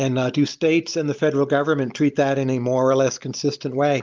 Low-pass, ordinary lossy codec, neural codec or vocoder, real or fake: 7.2 kHz; Opus, 32 kbps; none; real